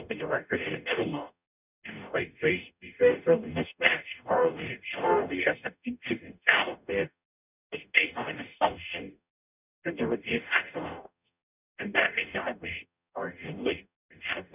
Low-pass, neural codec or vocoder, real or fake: 3.6 kHz; codec, 44.1 kHz, 0.9 kbps, DAC; fake